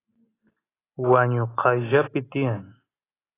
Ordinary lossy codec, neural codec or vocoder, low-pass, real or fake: AAC, 16 kbps; none; 3.6 kHz; real